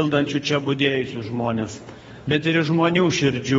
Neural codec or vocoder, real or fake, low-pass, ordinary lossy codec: codec, 24 kHz, 3 kbps, HILCodec; fake; 10.8 kHz; AAC, 24 kbps